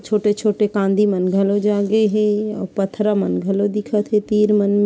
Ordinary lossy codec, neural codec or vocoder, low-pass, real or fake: none; none; none; real